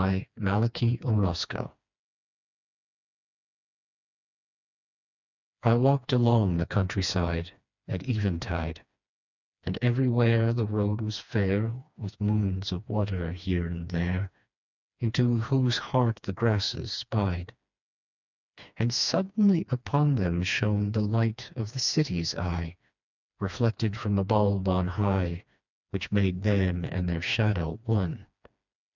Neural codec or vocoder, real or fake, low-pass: codec, 16 kHz, 2 kbps, FreqCodec, smaller model; fake; 7.2 kHz